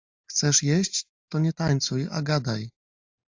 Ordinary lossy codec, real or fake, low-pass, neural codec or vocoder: AAC, 48 kbps; real; 7.2 kHz; none